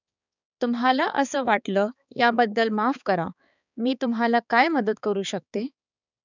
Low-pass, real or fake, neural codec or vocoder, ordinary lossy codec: 7.2 kHz; fake; codec, 16 kHz, 4 kbps, X-Codec, HuBERT features, trained on balanced general audio; none